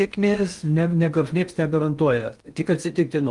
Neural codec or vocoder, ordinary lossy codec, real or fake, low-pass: codec, 16 kHz in and 24 kHz out, 0.6 kbps, FocalCodec, streaming, 2048 codes; Opus, 32 kbps; fake; 10.8 kHz